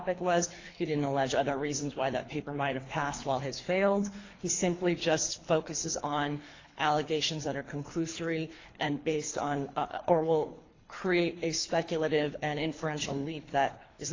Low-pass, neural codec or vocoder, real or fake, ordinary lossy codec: 7.2 kHz; codec, 24 kHz, 3 kbps, HILCodec; fake; AAC, 32 kbps